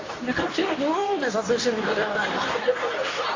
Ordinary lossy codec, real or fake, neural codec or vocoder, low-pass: AAC, 32 kbps; fake; codec, 24 kHz, 0.9 kbps, WavTokenizer, medium speech release version 2; 7.2 kHz